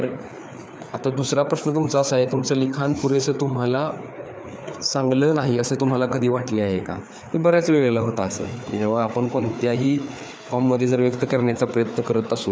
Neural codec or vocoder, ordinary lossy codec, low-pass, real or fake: codec, 16 kHz, 4 kbps, FreqCodec, larger model; none; none; fake